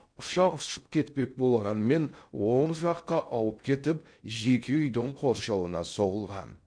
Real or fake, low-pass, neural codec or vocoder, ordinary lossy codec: fake; 9.9 kHz; codec, 16 kHz in and 24 kHz out, 0.6 kbps, FocalCodec, streaming, 2048 codes; MP3, 64 kbps